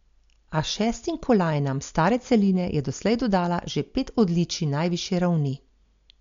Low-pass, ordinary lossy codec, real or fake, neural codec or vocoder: 7.2 kHz; MP3, 64 kbps; real; none